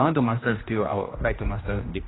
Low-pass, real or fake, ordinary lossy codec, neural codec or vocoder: 7.2 kHz; fake; AAC, 16 kbps; codec, 16 kHz, 1 kbps, X-Codec, HuBERT features, trained on general audio